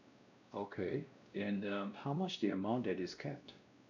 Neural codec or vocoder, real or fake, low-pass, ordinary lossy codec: codec, 16 kHz, 1 kbps, X-Codec, WavLM features, trained on Multilingual LibriSpeech; fake; 7.2 kHz; none